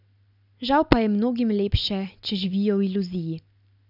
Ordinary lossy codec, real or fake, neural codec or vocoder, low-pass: none; real; none; 5.4 kHz